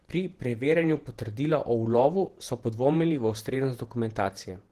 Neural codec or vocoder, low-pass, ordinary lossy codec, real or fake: vocoder, 48 kHz, 128 mel bands, Vocos; 14.4 kHz; Opus, 16 kbps; fake